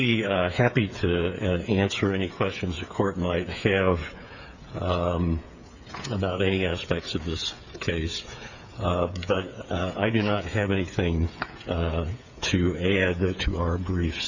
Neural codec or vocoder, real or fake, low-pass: codec, 16 kHz, 8 kbps, FreqCodec, smaller model; fake; 7.2 kHz